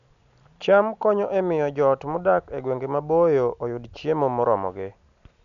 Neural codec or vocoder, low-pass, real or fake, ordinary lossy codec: none; 7.2 kHz; real; none